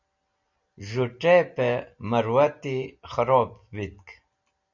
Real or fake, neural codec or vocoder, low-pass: real; none; 7.2 kHz